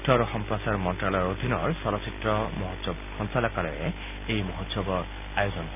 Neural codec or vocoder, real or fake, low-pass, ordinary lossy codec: none; real; 3.6 kHz; none